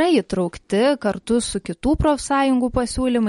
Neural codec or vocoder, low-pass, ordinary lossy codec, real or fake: none; 19.8 kHz; MP3, 48 kbps; real